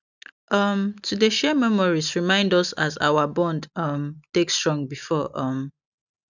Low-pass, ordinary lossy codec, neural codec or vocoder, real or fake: 7.2 kHz; none; none; real